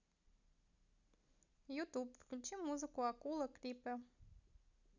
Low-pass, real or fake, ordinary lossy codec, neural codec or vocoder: 7.2 kHz; real; none; none